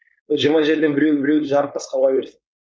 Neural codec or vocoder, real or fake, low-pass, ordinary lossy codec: codec, 16 kHz, 4.8 kbps, FACodec; fake; none; none